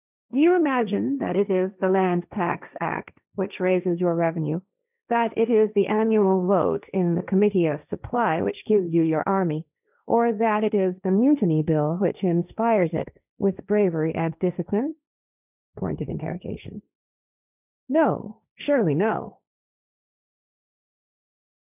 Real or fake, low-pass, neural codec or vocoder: fake; 3.6 kHz; codec, 16 kHz, 1.1 kbps, Voila-Tokenizer